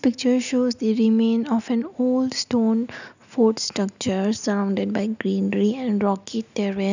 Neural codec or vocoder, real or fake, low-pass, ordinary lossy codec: none; real; 7.2 kHz; none